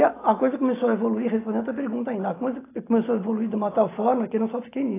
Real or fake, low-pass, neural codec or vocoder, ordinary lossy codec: real; 3.6 kHz; none; AAC, 16 kbps